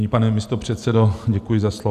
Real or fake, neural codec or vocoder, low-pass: real; none; 14.4 kHz